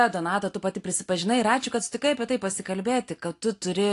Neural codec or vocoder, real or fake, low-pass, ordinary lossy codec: none; real; 10.8 kHz; AAC, 48 kbps